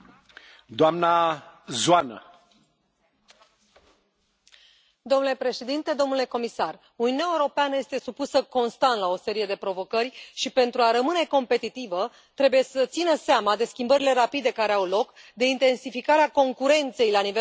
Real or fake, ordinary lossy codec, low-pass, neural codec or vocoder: real; none; none; none